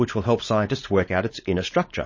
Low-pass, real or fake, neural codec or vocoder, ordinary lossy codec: 7.2 kHz; fake; codec, 16 kHz, 4.8 kbps, FACodec; MP3, 32 kbps